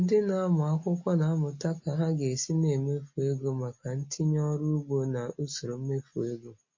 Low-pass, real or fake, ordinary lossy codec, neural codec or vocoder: 7.2 kHz; real; MP3, 32 kbps; none